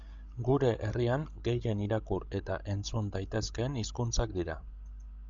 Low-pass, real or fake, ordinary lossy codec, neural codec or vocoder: 7.2 kHz; fake; Opus, 64 kbps; codec, 16 kHz, 16 kbps, FreqCodec, larger model